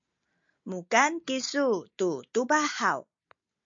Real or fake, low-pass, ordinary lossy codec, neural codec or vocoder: real; 7.2 kHz; MP3, 48 kbps; none